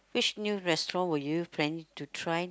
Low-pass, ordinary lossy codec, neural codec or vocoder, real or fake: none; none; none; real